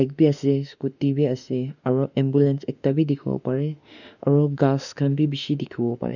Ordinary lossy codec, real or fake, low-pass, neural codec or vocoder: none; fake; 7.2 kHz; autoencoder, 48 kHz, 32 numbers a frame, DAC-VAE, trained on Japanese speech